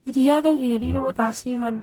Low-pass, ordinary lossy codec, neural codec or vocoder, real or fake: 19.8 kHz; none; codec, 44.1 kHz, 0.9 kbps, DAC; fake